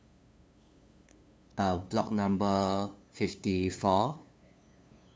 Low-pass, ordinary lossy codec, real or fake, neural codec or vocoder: none; none; fake; codec, 16 kHz, 2 kbps, FunCodec, trained on LibriTTS, 25 frames a second